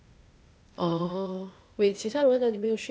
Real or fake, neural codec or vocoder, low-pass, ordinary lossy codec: fake; codec, 16 kHz, 0.8 kbps, ZipCodec; none; none